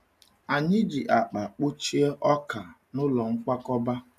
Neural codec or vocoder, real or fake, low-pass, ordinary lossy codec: none; real; 14.4 kHz; none